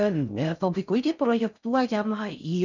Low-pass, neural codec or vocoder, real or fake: 7.2 kHz; codec, 16 kHz in and 24 kHz out, 0.6 kbps, FocalCodec, streaming, 4096 codes; fake